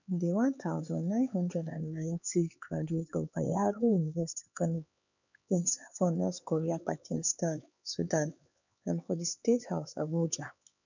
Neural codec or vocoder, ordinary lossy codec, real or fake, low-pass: codec, 16 kHz, 4 kbps, X-Codec, HuBERT features, trained on LibriSpeech; none; fake; 7.2 kHz